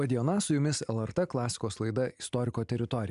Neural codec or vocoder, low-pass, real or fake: none; 10.8 kHz; real